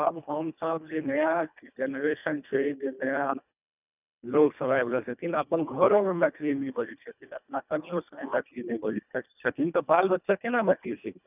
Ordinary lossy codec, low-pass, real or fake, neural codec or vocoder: none; 3.6 kHz; fake; codec, 24 kHz, 1.5 kbps, HILCodec